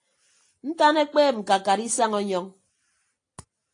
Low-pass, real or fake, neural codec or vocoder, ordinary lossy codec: 9.9 kHz; real; none; AAC, 48 kbps